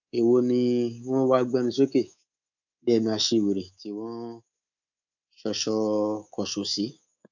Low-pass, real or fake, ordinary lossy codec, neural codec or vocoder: 7.2 kHz; fake; none; codec, 24 kHz, 3.1 kbps, DualCodec